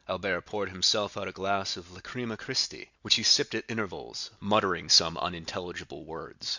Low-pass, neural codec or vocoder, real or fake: 7.2 kHz; none; real